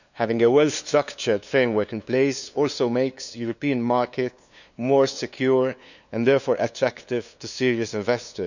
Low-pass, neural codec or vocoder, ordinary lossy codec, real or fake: 7.2 kHz; codec, 16 kHz, 2 kbps, FunCodec, trained on LibriTTS, 25 frames a second; none; fake